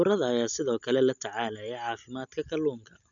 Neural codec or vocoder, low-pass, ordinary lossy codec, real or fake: none; 7.2 kHz; none; real